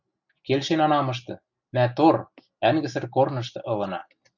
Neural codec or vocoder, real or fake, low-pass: none; real; 7.2 kHz